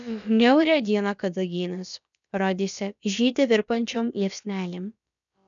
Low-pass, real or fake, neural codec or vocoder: 7.2 kHz; fake; codec, 16 kHz, about 1 kbps, DyCAST, with the encoder's durations